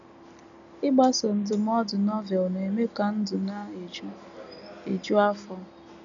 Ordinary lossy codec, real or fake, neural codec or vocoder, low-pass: none; real; none; 7.2 kHz